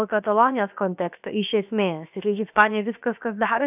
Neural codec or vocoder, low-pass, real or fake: codec, 16 kHz, about 1 kbps, DyCAST, with the encoder's durations; 3.6 kHz; fake